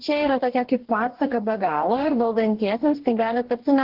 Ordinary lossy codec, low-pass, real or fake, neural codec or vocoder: Opus, 16 kbps; 5.4 kHz; fake; codec, 44.1 kHz, 2.6 kbps, DAC